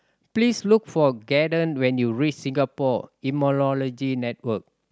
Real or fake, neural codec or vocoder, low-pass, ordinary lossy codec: real; none; none; none